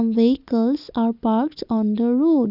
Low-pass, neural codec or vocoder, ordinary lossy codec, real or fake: 5.4 kHz; none; none; real